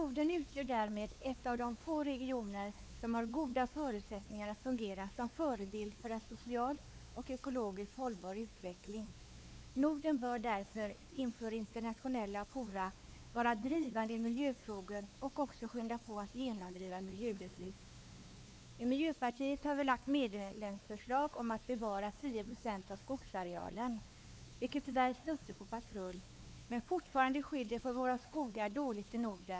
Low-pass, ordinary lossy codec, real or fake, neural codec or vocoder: none; none; fake; codec, 16 kHz, 4 kbps, X-Codec, WavLM features, trained on Multilingual LibriSpeech